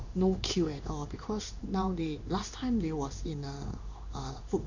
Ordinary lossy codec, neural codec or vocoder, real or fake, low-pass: none; codec, 16 kHz in and 24 kHz out, 1 kbps, XY-Tokenizer; fake; 7.2 kHz